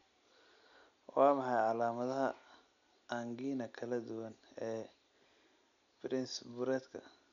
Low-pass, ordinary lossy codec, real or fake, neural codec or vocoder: 7.2 kHz; none; real; none